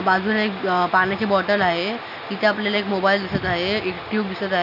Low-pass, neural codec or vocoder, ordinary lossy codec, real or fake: 5.4 kHz; none; none; real